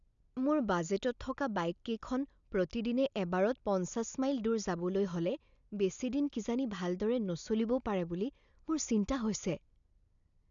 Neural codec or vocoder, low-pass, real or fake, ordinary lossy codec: none; 7.2 kHz; real; none